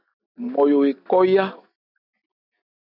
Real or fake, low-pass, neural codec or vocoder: real; 5.4 kHz; none